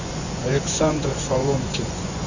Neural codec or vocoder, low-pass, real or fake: vocoder, 24 kHz, 100 mel bands, Vocos; 7.2 kHz; fake